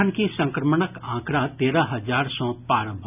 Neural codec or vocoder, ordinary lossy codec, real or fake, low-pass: none; none; real; 3.6 kHz